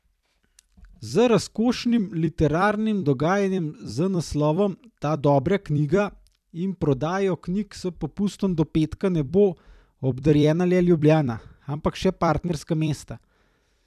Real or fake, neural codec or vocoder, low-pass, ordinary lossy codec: fake; vocoder, 44.1 kHz, 128 mel bands every 256 samples, BigVGAN v2; 14.4 kHz; none